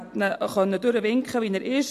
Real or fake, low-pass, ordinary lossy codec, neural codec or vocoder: real; 14.4 kHz; AAC, 64 kbps; none